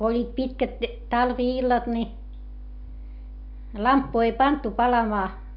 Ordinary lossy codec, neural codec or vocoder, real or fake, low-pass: MP3, 48 kbps; none; real; 5.4 kHz